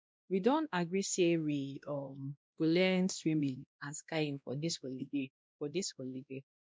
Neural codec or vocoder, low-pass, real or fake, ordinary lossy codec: codec, 16 kHz, 1 kbps, X-Codec, WavLM features, trained on Multilingual LibriSpeech; none; fake; none